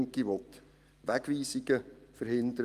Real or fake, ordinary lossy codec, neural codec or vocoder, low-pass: real; Opus, 32 kbps; none; 14.4 kHz